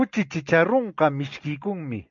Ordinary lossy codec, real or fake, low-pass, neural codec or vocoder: MP3, 64 kbps; real; 7.2 kHz; none